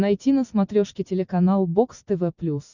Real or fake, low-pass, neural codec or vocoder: real; 7.2 kHz; none